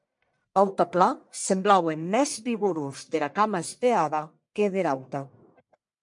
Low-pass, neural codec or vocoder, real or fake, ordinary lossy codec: 10.8 kHz; codec, 44.1 kHz, 1.7 kbps, Pupu-Codec; fake; MP3, 64 kbps